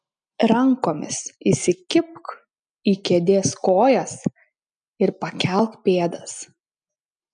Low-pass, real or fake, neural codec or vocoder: 9.9 kHz; real; none